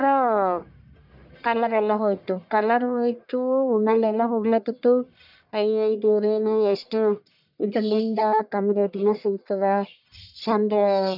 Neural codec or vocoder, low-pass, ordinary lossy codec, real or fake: codec, 44.1 kHz, 1.7 kbps, Pupu-Codec; 5.4 kHz; none; fake